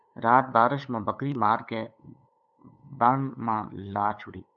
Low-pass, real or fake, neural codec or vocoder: 7.2 kHz; fake; codec, 16 kHz, 8 kbps, FunCodec, trained on LibriTTS, 25 frames a second